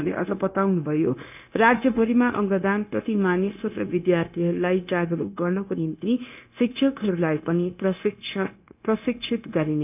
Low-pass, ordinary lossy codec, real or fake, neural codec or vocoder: 3.6 kHz; none; fake; codec, 16 kHz, 0.9 kbps, LongCat-Audio-Codec